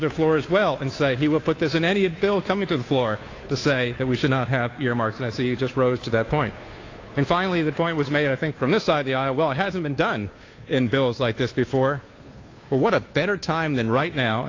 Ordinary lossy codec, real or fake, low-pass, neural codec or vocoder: AAC, 32 kbps; fake; 7.2 kHz; codec, 16 kHz, 2 kbps, FunCodec, trained on Chinese and English, 25 frames a second